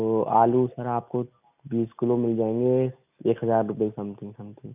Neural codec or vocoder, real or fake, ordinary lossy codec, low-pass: none; real; none; 3.6 kHz